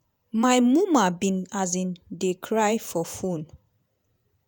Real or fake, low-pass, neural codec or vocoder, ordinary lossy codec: fake; none; vocoder, 48 kHz, 128 mel bands, Vocos; none